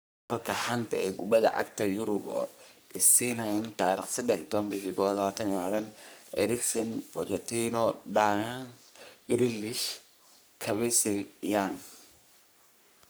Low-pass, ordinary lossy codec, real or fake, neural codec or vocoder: none; none; fake; codec, 44.1 kHz, 3.4 kbps, Pupu-Codec